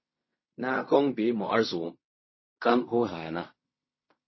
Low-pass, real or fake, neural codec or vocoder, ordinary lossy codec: 7.2 kHz; fake; codec, 16 kHz in and 24 kHz out, 0.4 kbps, LongCat-Audio-Codec, fine tuned four codebook decoder; MP3, 24 kbps